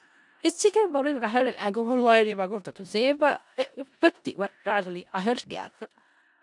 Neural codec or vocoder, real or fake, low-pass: codec, 16 kHz in and 24 kHz out, 0.4 kbps, LongCat-Audio-Codec, four codebook decoder; fake; 10.8 kHz